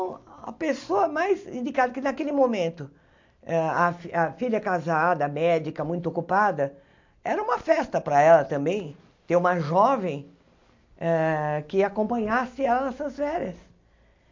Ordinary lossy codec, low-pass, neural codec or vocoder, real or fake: MP3, 48 kbps; 7.2 kHz; none; real